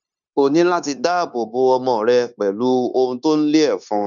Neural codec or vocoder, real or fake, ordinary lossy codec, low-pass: codec, 16 kHz, 0.9 kbps, LongCat-Audio-Codec; fake; none; 7.2 kHz